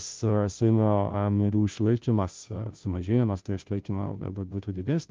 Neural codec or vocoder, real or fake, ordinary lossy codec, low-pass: codec, 16 kHz, 0.5 kbps, FunCodec, trained on Chinese and English, 25 frames a second; fake; Opus, 32 kbps; 7.2 kHz